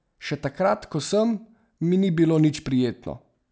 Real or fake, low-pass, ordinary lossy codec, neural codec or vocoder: real; none; none; none